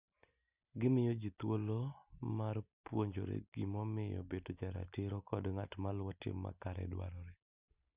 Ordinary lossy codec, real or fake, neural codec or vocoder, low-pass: Opus, 64 kbps; real; none; 3.6 kHz